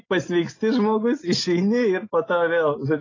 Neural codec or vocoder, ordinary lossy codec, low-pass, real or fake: none; AAC, 32 kbps; 7.2 kHz; real